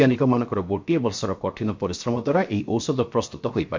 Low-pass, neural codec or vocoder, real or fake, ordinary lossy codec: 7.2 kHz; codec, 16 kHz, 0.7 kbps, FocalCodec; fake; MP3, 48 kbps